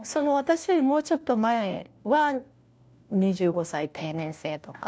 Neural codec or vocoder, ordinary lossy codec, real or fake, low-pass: codec, 16 kHz, 1 kbps, FunCodec, trained on LibriTTS, 50 frames a second; none; fake; none